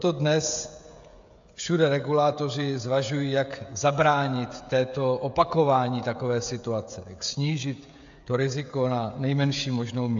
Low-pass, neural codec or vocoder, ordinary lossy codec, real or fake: 7.2 kHz; codec, 16 kHz, 16 kbps, FreqCodec, smaller model; AAC, 64 kbps; fake